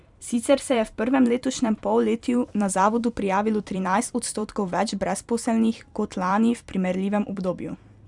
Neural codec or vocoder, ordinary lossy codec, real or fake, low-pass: vocoder, 44.1 kHz, 128 mel bands every 256 samples, BigVGAN v2; none; fake; 10.8 kHz